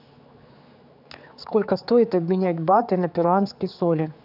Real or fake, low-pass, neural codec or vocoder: fake; 5.4 kHz; codec, 16 kHz, 4 kbps, X-Codec, HuBERT features, trained on general audio